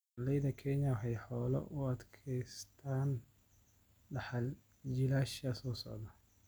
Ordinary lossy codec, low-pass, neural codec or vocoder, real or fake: none; none; none; real